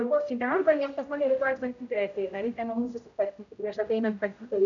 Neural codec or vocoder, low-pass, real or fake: codec, 16 kHz, 0.5 kbps, X-Codec, HuBERT features, trained on general audio; 7.2 kHz; fake